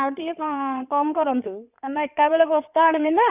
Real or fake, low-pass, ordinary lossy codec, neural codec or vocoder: fake; 3.6 kHz; none; codec, 16 kHz in and 24 kHz out, 2.2 kbps, FireRedTTS-2 codec